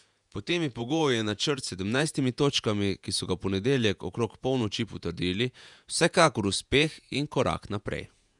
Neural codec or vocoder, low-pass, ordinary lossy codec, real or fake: vocoder, 48 kHz, 128 mel bands, Vocos; 10.8 kHz; none; fake